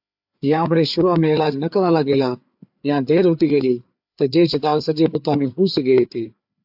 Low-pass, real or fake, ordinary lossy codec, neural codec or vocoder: 5.4 kHz; fake; AAC, 48 kbps; codec, 16 kHz, 4 kbps, FreqCodec, larger model